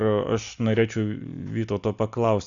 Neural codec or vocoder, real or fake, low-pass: none; real; 7.2 kHz